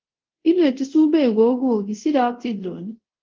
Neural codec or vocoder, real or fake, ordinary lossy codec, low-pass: codec, 24 kHz, 0.5 kbps, DualCodec; fake; Opus, 16 kbps; 7.2 kHz